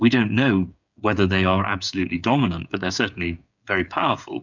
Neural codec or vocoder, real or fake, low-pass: codec, 16 kHz, 8 kbps, FreqCodec, smaller model; fake; 7.2 kHz